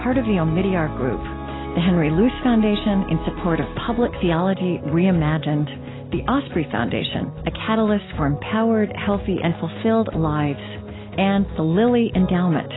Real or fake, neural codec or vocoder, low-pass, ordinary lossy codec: real; none; 7.2 kHz; AAC, 16 kbps